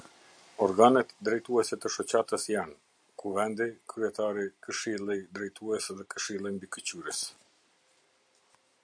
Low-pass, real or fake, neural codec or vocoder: 9.9 kHz; real; none